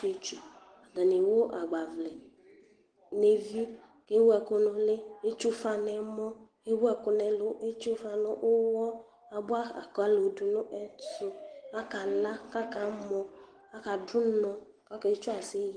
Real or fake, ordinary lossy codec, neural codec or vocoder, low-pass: real; Opus, 16 kbps; none; 9.9 kHz